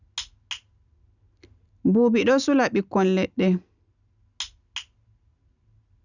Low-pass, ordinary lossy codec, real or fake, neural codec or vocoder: 7.2 kHz; none; real; none